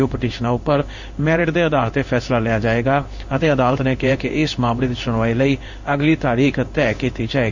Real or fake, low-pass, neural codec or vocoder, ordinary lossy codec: fake; 7.2 kHz; codec, 16 kHz in and 24 kHz out, 1 kbps, XY-Tokenizer; none